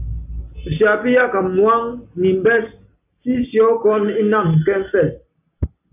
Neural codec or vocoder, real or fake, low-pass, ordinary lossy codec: none; real; 3.6 kHz; AAC, 32 kbps